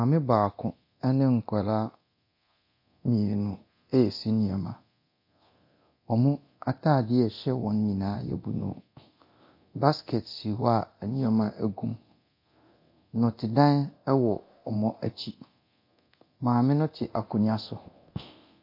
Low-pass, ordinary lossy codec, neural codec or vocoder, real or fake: 5.4 kHz; MP3, 32 kbps; codec, 24 kHz, 0.9 kbps, DualCodec; fake